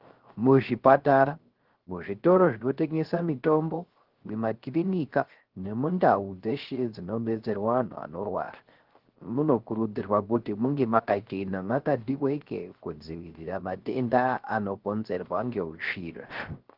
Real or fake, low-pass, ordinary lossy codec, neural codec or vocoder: fake; 5.4 kHz; Opus, 16 kbps; codec, 16 kHz, 0.3 kbps, FocalCodec